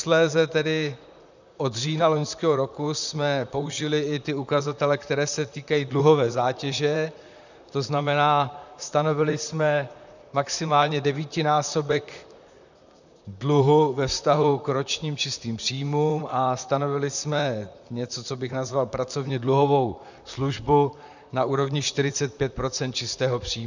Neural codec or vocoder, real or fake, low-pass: vocoder, 44.1 kHz, 128 mel bands, Pupu-Vocoder; fake; 7.2 kHz